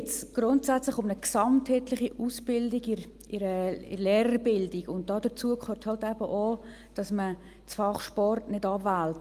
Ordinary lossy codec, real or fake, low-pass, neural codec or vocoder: Opus, 32 kbps; real; 14.4 kHz; none